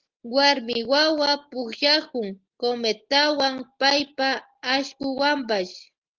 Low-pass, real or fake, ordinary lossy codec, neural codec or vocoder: 7.2 kHz; real; Opus, 16 kbps; none